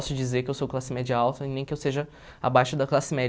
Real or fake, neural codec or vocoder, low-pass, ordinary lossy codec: real; none; none; none